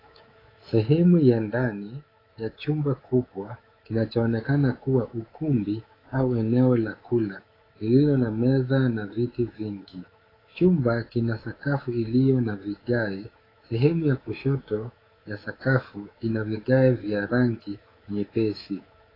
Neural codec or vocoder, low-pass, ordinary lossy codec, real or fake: codec, 24 kHz, 3.1 kbps, DualCodec; 5.4 kHz; AAC, 24 kbps; fake